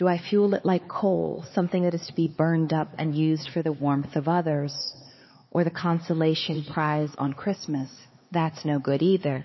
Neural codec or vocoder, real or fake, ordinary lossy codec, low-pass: codec, 16 kHz, 4 kbps, X-Codec, HuBERT features, trained on LibriSpeech; fake; MP3, 24 kbps; 7.2 kHz